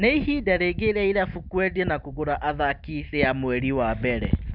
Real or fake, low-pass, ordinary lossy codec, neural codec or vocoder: real; 5.4 kHz; none; none